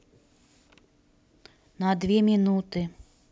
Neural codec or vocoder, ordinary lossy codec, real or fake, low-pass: none; none; real; none